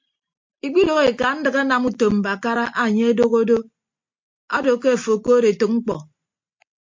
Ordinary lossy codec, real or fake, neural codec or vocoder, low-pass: MP3, 48 kbps; real; none; 7.2 kHz